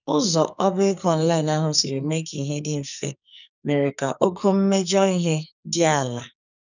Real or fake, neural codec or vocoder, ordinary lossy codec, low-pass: fake; codec, 44.1 kHz, 2.6 kbps, SNAC; none; 7.2 kHz